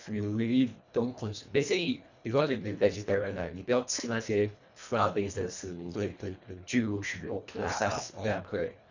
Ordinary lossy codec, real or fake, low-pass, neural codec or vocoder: none; fake; 7.2 kHz; codec, 24 kHz, 1.5 kbps, HILCodec